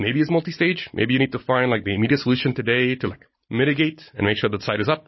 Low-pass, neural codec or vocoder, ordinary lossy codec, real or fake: 7.2 kHz; none; MP3, 24 kbps; real